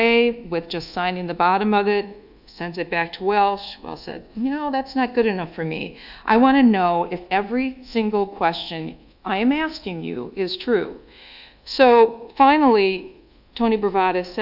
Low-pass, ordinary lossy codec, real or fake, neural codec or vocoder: 5.4 kHz; AAC, 48 kbps; fake; codec, 24 kHz, 1.2 kbps, DualCodec